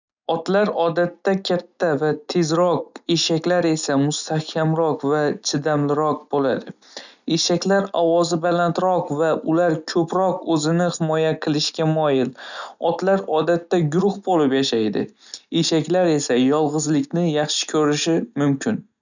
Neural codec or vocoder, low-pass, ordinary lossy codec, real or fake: none; 7.2 kHz; none; real